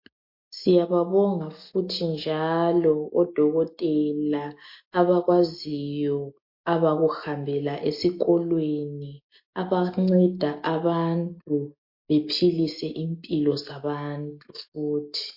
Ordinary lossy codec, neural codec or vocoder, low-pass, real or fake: MP3, 32 kbps; none; 5.4 kHz; real